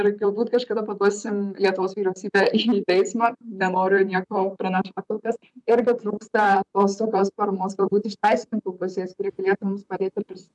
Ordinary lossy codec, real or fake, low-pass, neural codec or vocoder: MP3, 96 kbps; fake; 10.8 kHz; vocoder, 44.1 kHz, 128 mel bands, Pupu-Vocoder